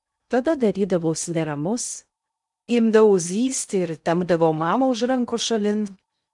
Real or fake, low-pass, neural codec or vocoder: fake; 10.8 kHz; codec, 16 kHz in and 24 kHz out, 0.6 kbps, FocalCodec, streaming, 2048 codes